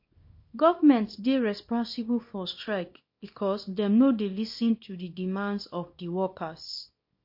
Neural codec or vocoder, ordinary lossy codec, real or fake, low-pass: codec, 24 kHz, 0.9 kbps, WavTokenizer, small release; MP3, 32 kbps; fake; 5.4 kHz